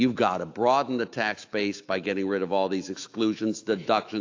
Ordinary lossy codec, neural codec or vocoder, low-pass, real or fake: AAC, 48 kbps; autoencoder, 48 kHz, 128 numbers a frame, DAC-VAE, trained on Japanese speech; 7.2 kHz; fake